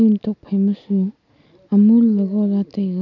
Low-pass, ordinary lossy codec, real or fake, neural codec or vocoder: 7.2 kHz; none; real; none